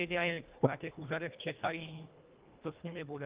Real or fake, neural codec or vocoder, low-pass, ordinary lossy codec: fake; codec, 24 kHz, 1.5 kbps, HILCodec; 3.6 kHz; Opus, 24 kbps